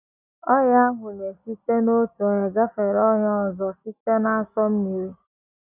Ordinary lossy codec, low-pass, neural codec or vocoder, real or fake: none; 3.6 kHz; none; real